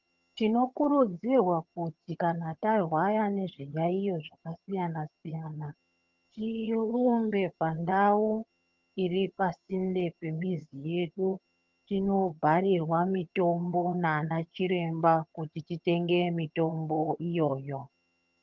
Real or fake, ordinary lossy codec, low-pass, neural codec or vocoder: fake; Opus, 32 kbps; 7.2 kHz; vocoder, 22.05 kHz, 80 mel bands, HiFi-GAN